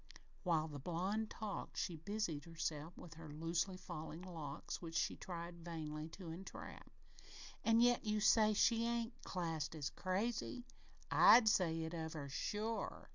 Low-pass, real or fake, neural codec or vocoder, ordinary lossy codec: 7.2 kHz; real; none; Opus, 64 kbps